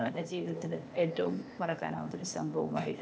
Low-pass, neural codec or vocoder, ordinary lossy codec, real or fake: none; codec, 16 kHz, 0.8 kbps, ZipCodec; none; fake